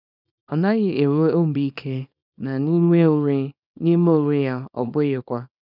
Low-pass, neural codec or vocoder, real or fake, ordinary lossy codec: 5.4 kHz; codec, 24 kHz, 0.9 kbps, WavTokenizer, small release; fake; none